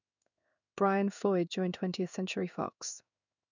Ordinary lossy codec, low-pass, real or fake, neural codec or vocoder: none; 7.2 kHz; fake; codec, 16 kHz in and 24 kHz out, 1 kbps, XY-Tokenizer